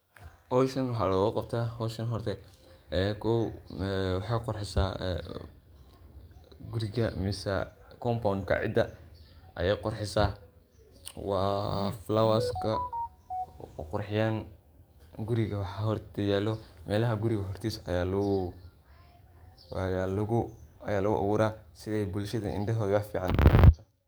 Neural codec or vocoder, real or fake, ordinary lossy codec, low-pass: codec, 44.1 kHz, 7.8 kbps, DAC; fake; none; none